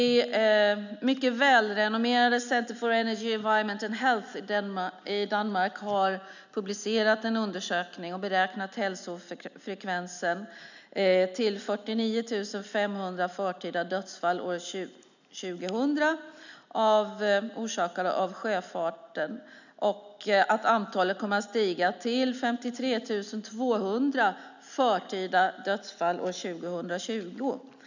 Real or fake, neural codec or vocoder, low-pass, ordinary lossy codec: real; none; 7.2 kHz; none